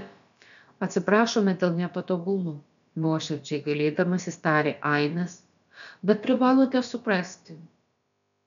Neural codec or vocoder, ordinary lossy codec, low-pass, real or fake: codec, 16 kHz, about 1 kbps, DyCAST, with the encoder's durations; MP3, 96 kbps; 7.2 kHz; fake